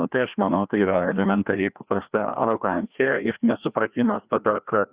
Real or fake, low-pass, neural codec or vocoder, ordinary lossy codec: fake; 3.6 kHz; codec, 16 kHz, 1 kbps, FreqCodec, larger model; Opus, 64 kbps